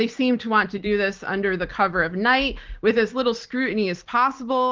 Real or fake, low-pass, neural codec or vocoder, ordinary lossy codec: real; 7.2 kHz; none; Opus, 16 kbps